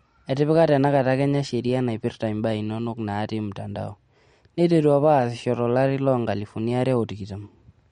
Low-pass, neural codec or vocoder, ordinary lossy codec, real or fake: 9.9 kHz; none; MP3, 48 kbps; real